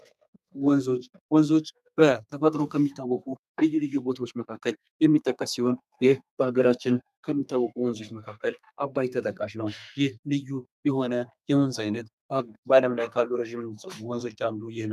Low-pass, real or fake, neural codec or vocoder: 14.4 kHz; fake; codec, 32 kHz, 1.9 kbps, SNAC